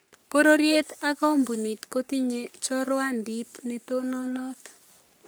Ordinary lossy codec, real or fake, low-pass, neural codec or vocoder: none; fake; none; codec, 44.1 kHz, 3.4 kbps, Pupu-Codec